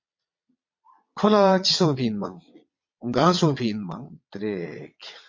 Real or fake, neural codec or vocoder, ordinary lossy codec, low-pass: fake; vocoder, 22.05 kHz, 80 mel bands, Vocos; MP3, 48 kbps; 7.2 kHz